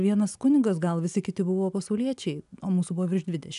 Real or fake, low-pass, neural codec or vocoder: real; 10.8 kHz; none